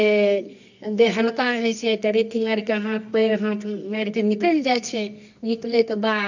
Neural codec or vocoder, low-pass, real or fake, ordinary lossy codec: codec, 24 kHz, 0.9 kbps, WavTokenizer, medium music audio release; 7.2 kHz; fake; none